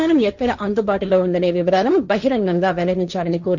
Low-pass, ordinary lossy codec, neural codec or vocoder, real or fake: 7.2 kHz; none; codec, 16 kHz, 1.1 kbps, Voila-Tokenizer; fake